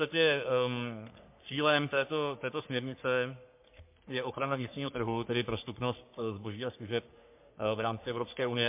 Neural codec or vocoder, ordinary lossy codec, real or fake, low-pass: codec, 44.1 kHz, 3.4 kbps, Pupu-Codec; MP3, 32 kbps; fake; 3.6 kHz